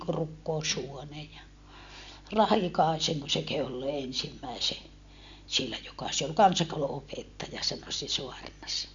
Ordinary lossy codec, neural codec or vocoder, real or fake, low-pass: MP3, 64 kbps; none; real; 7.2 kHz